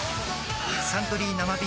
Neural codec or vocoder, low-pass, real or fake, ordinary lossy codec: none; none; real; none